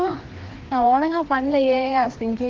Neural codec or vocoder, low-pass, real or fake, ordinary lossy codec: codec, 16 kHz, 2 kbps, FreqCodec, larger model; 7.2 kHz; fake; Opus, 16 kbps